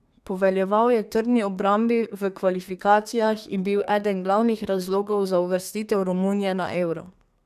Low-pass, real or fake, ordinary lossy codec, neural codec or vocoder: 14.4 kHz; fake; none; codec, 32 kHz, 1.9 kbps, SNAC